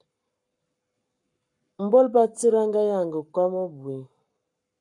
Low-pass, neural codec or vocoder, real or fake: 10.8 kHz; codec, 44.1 kHz, 7.8 kbps, Pupu-Codec; fake